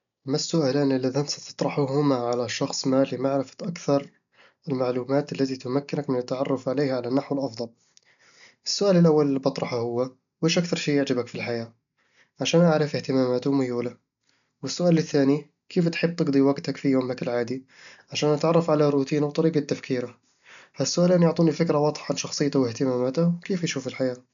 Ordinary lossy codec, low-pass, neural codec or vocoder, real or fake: none; 7.2 kHz; none; real